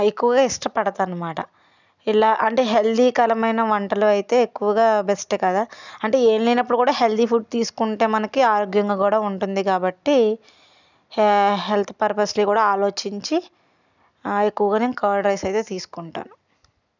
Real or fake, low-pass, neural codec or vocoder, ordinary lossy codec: real; 7.2 kHz; none; none